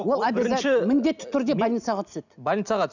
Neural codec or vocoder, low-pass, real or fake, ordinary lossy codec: none; 7.2 kHz; real; none